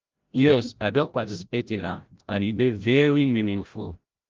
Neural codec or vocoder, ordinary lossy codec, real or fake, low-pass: codec, 16 kHz, 0.5 kbps, FreqCodec, larger model; Opus, 32 kbps; fake; 7.2 kHz